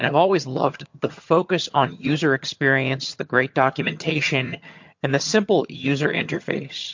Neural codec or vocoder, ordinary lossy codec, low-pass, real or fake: vocoder, 22.05 kHz, 80 mel bands, HiFi-GAN; MP3, 48 kbps; 7.2 kHz; fake